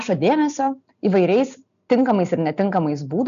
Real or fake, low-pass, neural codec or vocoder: real; 7.2 kHz; none